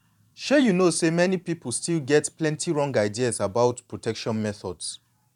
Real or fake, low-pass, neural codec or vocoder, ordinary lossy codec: fake; 19.8 kHz; vocoder, 44.1 kHz, 128 mel bands every 512 samples, BigVGAN v2; none